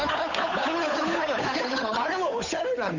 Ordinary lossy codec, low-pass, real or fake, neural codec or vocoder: none; 7.2 kHz; fake; codec, 16 kHz, 8 kbps, FunCodec, trained on Chinese and English, 25 frames a second